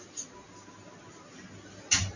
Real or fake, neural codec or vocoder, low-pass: real; none; 7.2 kHz